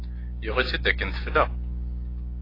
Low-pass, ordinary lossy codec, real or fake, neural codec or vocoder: 5.4 kHz; AAC, 24 kbps; real; none